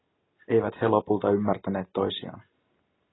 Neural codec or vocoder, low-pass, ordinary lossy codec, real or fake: none; 7.2 kHz; AAC, 16 kbps; real